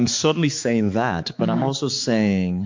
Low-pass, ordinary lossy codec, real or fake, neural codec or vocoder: 7.2 kHz; MP3, 48 kbps; fake; codec, 16 kHz, 4 kbps, X-Codec, HuBERT features, trained on balanced general audio